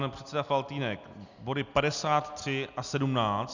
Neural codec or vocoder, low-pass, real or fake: none; 7.2 kHz; real